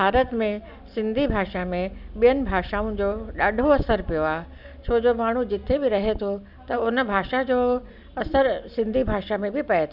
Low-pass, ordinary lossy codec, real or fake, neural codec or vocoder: 5.4 kHz; none; real; none